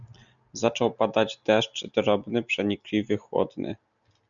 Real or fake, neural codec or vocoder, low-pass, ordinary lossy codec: real; none; 7.2 kHz; MP3, 96 kbps